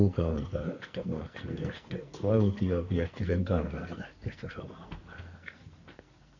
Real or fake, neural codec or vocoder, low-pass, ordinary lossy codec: fake; codec, 44.1 kHz, 2.6 kbps, SNAC; 7.2 kHz; none